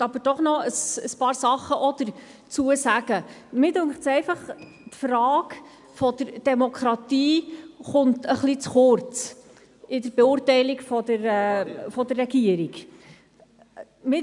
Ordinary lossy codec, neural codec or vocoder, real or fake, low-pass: none; none; real; 10.8 kHz